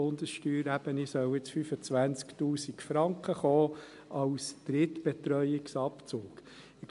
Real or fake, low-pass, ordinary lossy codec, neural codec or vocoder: real; 10.8 kHz; MP3, 64 kbps; none